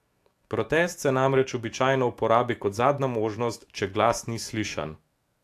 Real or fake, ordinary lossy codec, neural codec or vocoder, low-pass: fake; AAC, 64 kbps; autoencoder, 48 kHz, 128 numbers a frame, DAC-VAE, trained on Japanese speech; 14.4 kHz